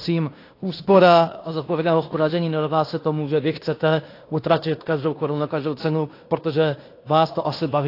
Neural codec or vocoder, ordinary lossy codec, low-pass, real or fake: codec, 16 kHz in and 24 kHz out, 0.9 kbps, LongCat-Audio-Codec, fine tuned four codebook decoder; AAC, 32 kbps; 5.4 kHz; fake